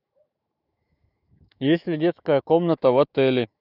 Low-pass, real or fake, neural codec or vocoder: 5.4 kHz; fake; autoencoder, 48 kHz, 128 numbers a frame, DAC-VAE, trained on Japanese speech